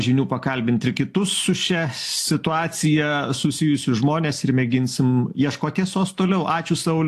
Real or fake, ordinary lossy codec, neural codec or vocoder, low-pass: real; AAC, 64 kbps; none; 14.4 kHz